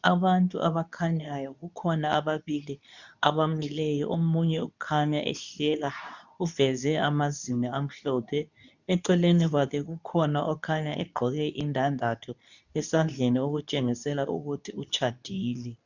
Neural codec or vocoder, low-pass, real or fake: codec, 24 kHz, 0.9 kbps, WavTokenizer, medium speech release version 2; 7.2 kHz; fake